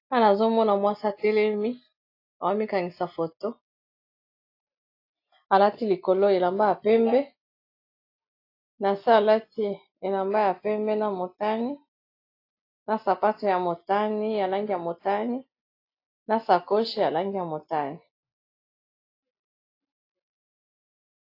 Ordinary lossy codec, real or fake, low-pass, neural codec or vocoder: AAC, 32 kbps; real; 5.4 kHz; none